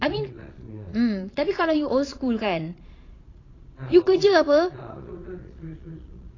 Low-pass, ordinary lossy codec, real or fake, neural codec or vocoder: 7.2 kHz; AAC, 32 kbps; fake; vocoder, 22.05 kHz, 80 mel bands, Vocos